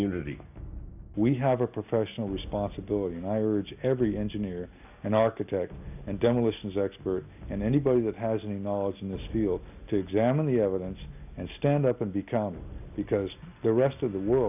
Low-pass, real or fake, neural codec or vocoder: 3.6 kHz; real; none